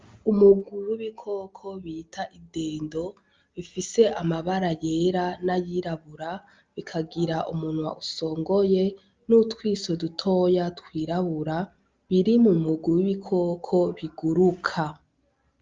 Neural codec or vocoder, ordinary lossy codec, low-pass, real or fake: none; Opus, 32 kbps; 7.2 kHz; real